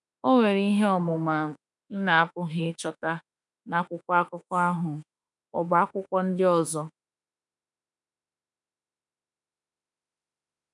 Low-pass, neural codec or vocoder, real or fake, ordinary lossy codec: 10.8 kHz; autoencoder, 48 kHz, 32 numbers a frame, DAC-VAE, trained on Japanese speech; fake; none